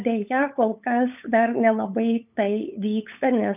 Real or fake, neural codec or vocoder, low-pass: fake; codec, 16 kHz, 8 kbps, FunCodec, trained on LibriTTS, 25 frames a second; 3.6 kHz